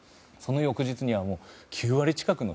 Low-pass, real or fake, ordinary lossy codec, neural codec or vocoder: none; real; none; none